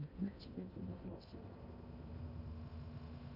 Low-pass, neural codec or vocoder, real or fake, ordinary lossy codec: 5.4 kHz; codec, 16 kHz in and 24 kHz out, 0.6 kbps, FocalCodec, streaming, 2048 codes; fake; Opus, 64 kbps